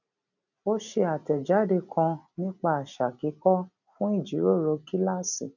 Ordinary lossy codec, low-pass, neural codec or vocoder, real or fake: none; 7.2 kHz; none; real